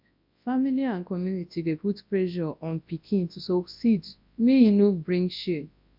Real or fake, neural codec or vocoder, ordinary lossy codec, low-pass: fake; codec, 24 kHz, 0.9 kbps, WavTokenizer, large speech release; MP3, 48 kbps; 5.4 kHz